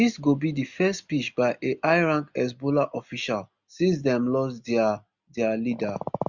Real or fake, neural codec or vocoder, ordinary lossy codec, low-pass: real; none; Opus, 64 kbps; 7.2 kHz